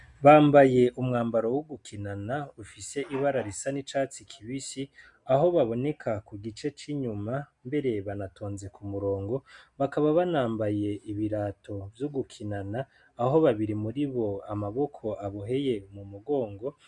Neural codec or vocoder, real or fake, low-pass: none; real; 10.8 kHz